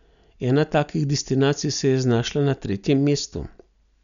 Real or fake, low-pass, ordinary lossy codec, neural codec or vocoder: real; 7.2 kHz; none; none